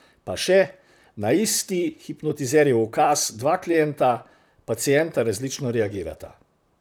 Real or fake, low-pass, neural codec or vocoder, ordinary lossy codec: fake; none; vocoder, 44.1 kHz, 128 mel bands, Pupu-Vocoder; none